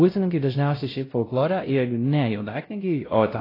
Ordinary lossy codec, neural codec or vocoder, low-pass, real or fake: AAC, 24 kbps; codec, 16 kHz, 0.5 kbps, X-Codec, WavLM features, trained on Multilingual LibriSpeech; 5.4 kHz; fake